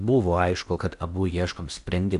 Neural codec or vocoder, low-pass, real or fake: codec, 16 kHz in and 24 kHz out, 0.8 kbps, FocalCodec, streaming, 65536 codes; 10.8 kHz; fake